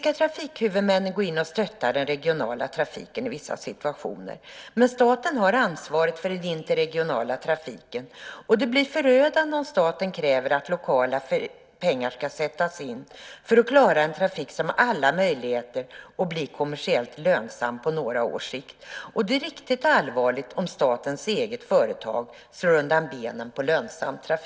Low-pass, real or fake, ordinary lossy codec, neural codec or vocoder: none; real; none; none